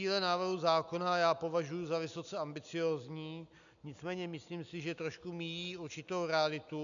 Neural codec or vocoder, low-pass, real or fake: none; 7.2 kHz; real